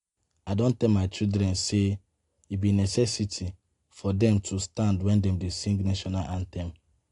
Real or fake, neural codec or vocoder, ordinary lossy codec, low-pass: real; none; AAC, 48 kbps; 10.8 kHz